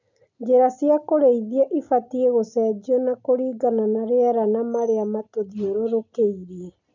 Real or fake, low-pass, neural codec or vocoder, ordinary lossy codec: real; 7.2 kHz; none; none